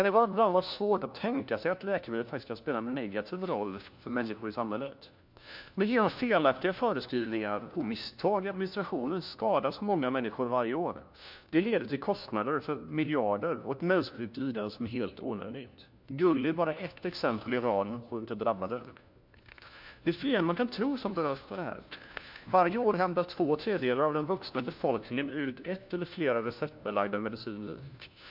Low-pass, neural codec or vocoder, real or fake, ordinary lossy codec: 5.4 kHz; codec, 16 kHz, 1 kbps, FunCodec, trained on LibriTTS, 50 frames a second; fake; MP3, 48 kbps